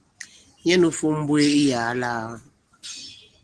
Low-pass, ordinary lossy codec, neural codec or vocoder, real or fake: 10.8 kHz; Opus, 16 kbps; none; real